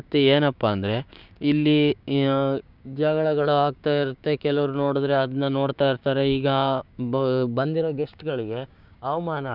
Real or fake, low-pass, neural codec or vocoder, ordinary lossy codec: fake; 5.4 kHz; codec, 16 kHz, 6 kbps, DAC; none